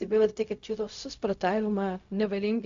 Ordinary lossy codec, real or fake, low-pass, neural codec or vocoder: AAC, 64 kbps; fake; 7.2 kHz; codec, 16 kHz, 0.4 kbps, LongCat-Audio-Codec